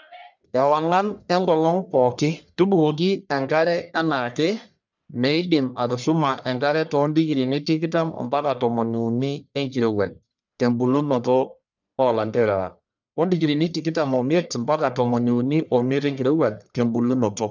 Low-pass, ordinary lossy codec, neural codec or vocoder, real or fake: 7.2 kHz; none; codec, 44.1 kHz, 1.7 kbps, Pupu-Codec; fake